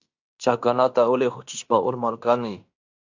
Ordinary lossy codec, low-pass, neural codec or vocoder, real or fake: AAC, 48 kbps; 7.2 kHz; codec, 16 kHz in and 24 kHz out, 0.9 kbps, LongCat-Audio-Codec, fine tuned four codebook decoder; fake